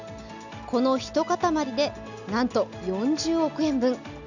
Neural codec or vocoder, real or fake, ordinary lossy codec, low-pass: none; real; none; 7.2 kHz